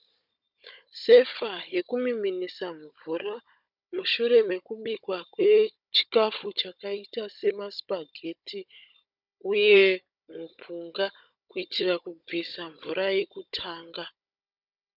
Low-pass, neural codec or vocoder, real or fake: 5.4 kHz; codec, 16 kHz, 16 kbps, FunCodec, trained on Chinese and English, 50 frames a second; fake